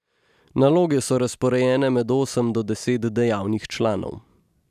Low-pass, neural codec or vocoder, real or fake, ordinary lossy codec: 14.4 kHz; none; real; none